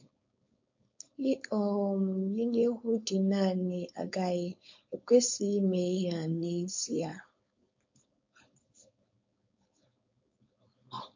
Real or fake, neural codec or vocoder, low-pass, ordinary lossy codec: fake; codec, 16 kHz, 4.8 kbps, FACodec; 7.2 kHz; MP3, 48 kbps